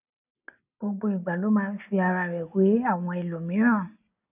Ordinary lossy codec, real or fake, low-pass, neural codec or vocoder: none; real; 3.6 kHz; none